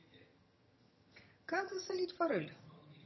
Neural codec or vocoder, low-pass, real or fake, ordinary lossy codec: vocoder, 22.05 kHz, 80 mel bands, HiFi-GAN; 7.2 kHz; fake; MP3, 24 kbps